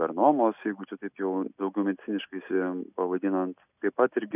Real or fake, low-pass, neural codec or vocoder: real; 3.6 kHz; none